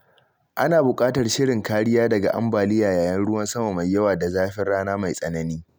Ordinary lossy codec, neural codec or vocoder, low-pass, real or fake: none; none; none; real